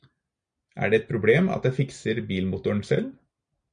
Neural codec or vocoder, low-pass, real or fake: none; 9.9 kHz; real